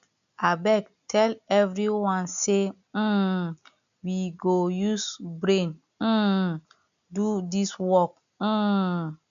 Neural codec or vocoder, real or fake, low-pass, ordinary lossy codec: none; real; 7.2 kHz; none